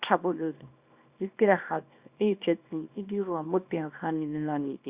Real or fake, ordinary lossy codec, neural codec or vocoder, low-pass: fake; Opus, 64 kbps; codec, 24 kHz, 0.9 kbps, WavTokenizer, small release; 3.6 kHz